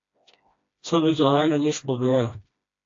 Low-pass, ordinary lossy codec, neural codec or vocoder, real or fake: 7.2 kHz; AAC, 48 kbps; codec, 16 kHz, 1 kbps, FreqCodec, smaller model; fake